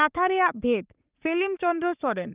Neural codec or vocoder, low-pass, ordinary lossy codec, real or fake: codec, 44.1 kHz, 3.4 kbps, Pupu-Codec; 3.6 kHz; Opus, 32 kbps; fake